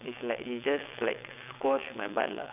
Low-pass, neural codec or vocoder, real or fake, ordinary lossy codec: 3.6 kHz; vocoder, 22.05 kHz, 80 mel bands, WaveNeXt; fake; none